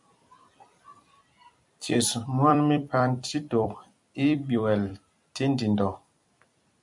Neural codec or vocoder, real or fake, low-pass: vocoder, 44.1 kHz, 128 mel bands every 256 samples, BigVGAN v2; fake; 10.8 kHz